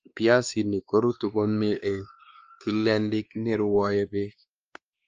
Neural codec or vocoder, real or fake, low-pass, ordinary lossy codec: codec, 16 kHz, 2 kbps, X-Codec, WavLM features, trained on Multilingual LibriSpeech; fake; 7.2 kHz; Opus, 24 kbps